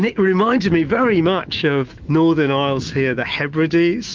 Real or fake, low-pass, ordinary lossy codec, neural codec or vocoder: fake; 7.2 kHz; Opus, 32 kbps; autoencoder, 48 kHz, 128 numbers a frame, DAC-VAE, trained on Japanese speech